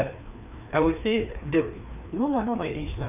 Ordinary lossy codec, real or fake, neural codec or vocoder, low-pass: none; fake; codec, 16 kHz, 2 kbps, FreqCodec, larger model; 3.6 kHz